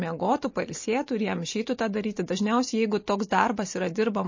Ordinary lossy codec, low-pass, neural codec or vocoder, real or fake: MP3, 32 kbps; 7.2 kHz; none; real